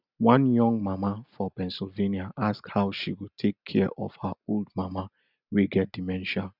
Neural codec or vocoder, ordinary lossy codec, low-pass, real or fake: none; none; 5.4 kHz; real